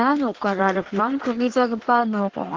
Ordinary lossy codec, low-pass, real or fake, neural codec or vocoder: Opus, 16 kbps; 7.2 kHz; fake; codec, 16 kHz in and 24 kHz out, 1.1 kbps, FireRedTTS-2 codec